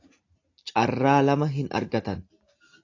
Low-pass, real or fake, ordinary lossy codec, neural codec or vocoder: 7.2 kHz; real; AAC, 48 kbps; none